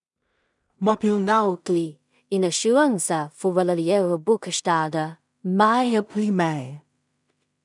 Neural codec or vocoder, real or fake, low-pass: codec, 16 kHz in and 24 kHz out, 0.4 kbps, LongCat-Audio-Codec, two codebook decoder; fake; 10.8 kHz